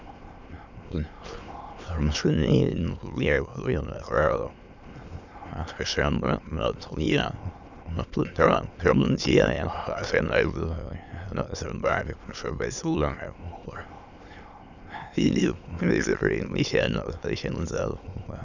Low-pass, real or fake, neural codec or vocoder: 7.2 kHz; fake; autoencoder, 22.05 kHz, a latent of 192 numbers a frame, VITS, trained on many speakers